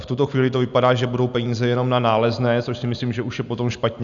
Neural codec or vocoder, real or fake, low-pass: none; real; 7.2 kHz